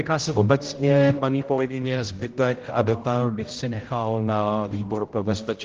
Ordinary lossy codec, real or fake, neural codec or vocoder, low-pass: Opus, 16 kbps; fake; codec, 16 kHz, 0.5 kbps, X-Codec, HuBERT features, trained on general audio; 7.2 kHz